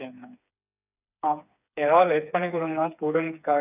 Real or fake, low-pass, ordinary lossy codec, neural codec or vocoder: fake; 3.6 kHz; none; codec, 16 kHz, 4 kbps, FreqCodec, smaller model